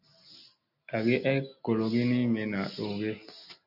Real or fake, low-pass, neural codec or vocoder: real; 5.4 kHz; none